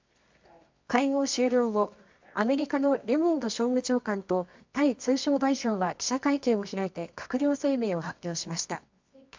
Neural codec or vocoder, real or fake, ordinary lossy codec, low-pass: codec, 24 kHz, 0.9 kbps, WavTokenizer, medium music audio release; fake; MP3, 64 kbps; 7.2 kHz